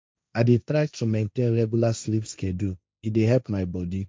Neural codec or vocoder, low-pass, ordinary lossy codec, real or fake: codec, 16 kHz, 1.1 kbps, Voila-Tokenizer; none; none; fake